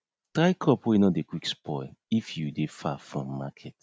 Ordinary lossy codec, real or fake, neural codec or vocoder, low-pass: none; real; none; none